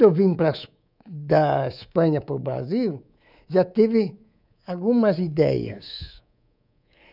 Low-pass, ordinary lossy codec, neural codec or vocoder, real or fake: 5.4 kHz; none; codec, 24 kHz, 3.1 kbps, DualCodec; fake